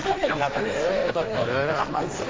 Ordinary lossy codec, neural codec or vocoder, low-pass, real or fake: none; codec, 16 kHz, 1.1 kbps, Voila-Tokenizer; none; fake